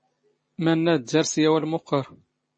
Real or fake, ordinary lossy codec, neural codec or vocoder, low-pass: real; MP3, 32 kbps; none; 9.9 kHz